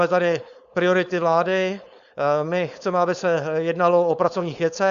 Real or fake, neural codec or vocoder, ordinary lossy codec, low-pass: fake; codec, 16 kHz, 4.8 kbps, FACodec; Opus, 64 kbps; 7.2 kHz